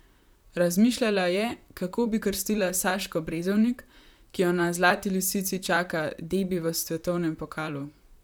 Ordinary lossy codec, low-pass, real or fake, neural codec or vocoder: none; none; fake; vocoder, 44.1 kHz, 128 mel bands, Pupu-Vocoder